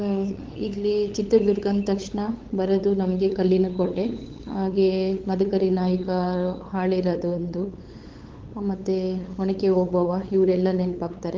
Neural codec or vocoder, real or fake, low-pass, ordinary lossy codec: codec, 16 kHz, 8 kbps, FunCodec, trained on LibriTTS, 25 frames a second; fake; 7.2 kHz; Opus, 16 kbps